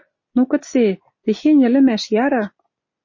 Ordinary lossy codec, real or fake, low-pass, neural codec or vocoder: MP3, 32 kbps; real; 7.2 kHz; none